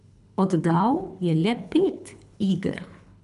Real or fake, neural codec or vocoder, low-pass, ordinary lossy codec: fake; codec, 24 kHz, 3 kbps, HILCodec; 10.8 kHz; none